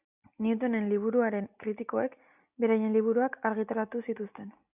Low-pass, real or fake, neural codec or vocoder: 3.6 kHz; real; none